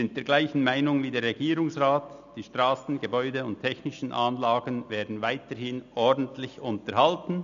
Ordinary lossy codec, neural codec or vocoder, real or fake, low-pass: none; none; real; 7.2 kHz